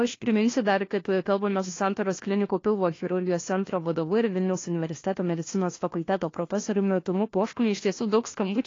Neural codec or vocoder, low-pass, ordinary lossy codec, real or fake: codec, 16 kHz, 1 kbps, FunCodec, trained on LibriTTS, 50 frames a second; 7.2 kHz; AAC, 32 kbps; fake